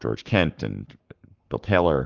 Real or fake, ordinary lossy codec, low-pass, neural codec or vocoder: fake; Opus, 32 kbps; 7.2 kHz; codec, 44.1 kHz, 7.8 kbps, Pupu-Codec